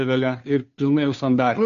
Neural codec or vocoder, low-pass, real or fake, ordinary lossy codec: codec, 16 kHz, 2 kbps, FunCodec, trained on Chinese and English, 25 frames a second; 7.2 kHz; fake; MP3, 64 kbps